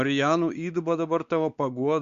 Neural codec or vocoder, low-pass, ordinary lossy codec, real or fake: codec, 16 kHz, 4 kbps, X-Codec, WavLM features, trained on Multilingual LibriSpeech; 7.2 kHz; Opus, 64 kbps; fake